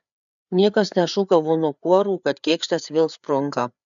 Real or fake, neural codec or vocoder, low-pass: fake; codec, 16 kHz, 4 kbps, FreqCodec, larger model; 7.2 kHz